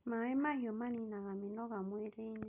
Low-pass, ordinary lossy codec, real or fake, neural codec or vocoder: 3.6 kHz; none; real; none